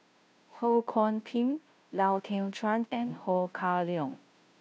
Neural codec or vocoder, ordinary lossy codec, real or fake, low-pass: codec, 16 kHz, 0.5 kbps, FunCodec, trained on Chinese and English, 25 frames a second; none; fake; none